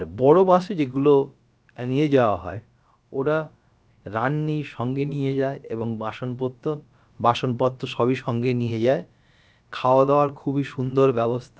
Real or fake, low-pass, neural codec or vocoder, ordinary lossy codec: fake; none; codec, 16 kHz, about 1 kbps, DyCAST, with the encoder's durations; none